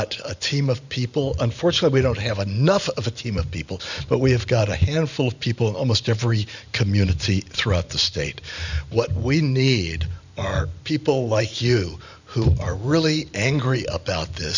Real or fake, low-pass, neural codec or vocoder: fake; 7.2 kHz; vocoder, 44.1 kHz, 128 mel bands every 512 samples, BigVGAN v2